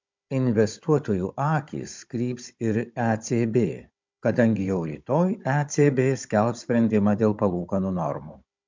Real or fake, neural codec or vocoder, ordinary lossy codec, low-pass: fake; codec, 16 kHz, 16 kbps, FunCodec, trained on Chinese and English, 50 frames a second; MP3, 64 kbps; 7.2 kHz